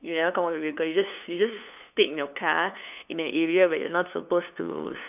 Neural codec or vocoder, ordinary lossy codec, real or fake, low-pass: codec, 16 kHz, 2 kbps, FunCodec, trained on LibriTTS, 25 frames a second; none; fake; 3.6 kHz